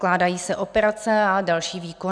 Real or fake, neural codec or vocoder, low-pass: real; none; 9.9 kHz